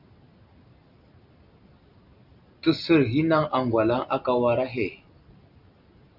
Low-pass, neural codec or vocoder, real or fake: 5.4 kHz; none; real